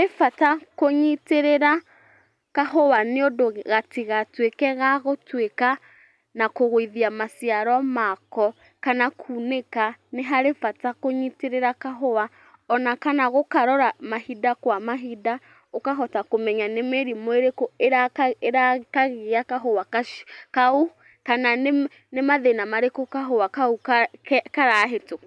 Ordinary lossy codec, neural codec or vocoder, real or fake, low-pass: none; none; real; none